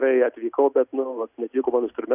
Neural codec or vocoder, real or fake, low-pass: none; real; 3.6 kHz